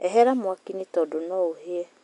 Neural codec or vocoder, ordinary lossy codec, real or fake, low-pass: none; none; real; 10.8 kHz